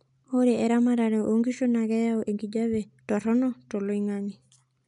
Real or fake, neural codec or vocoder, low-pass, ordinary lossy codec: real; none; 10.8 kHz; MP3, 96 kbps